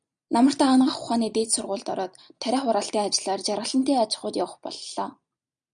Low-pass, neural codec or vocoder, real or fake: 9.9 kHz; none; real